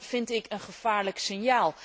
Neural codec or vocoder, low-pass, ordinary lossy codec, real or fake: none; none; none; real